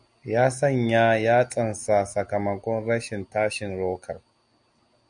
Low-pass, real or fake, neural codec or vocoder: 9.9 kHz; real; none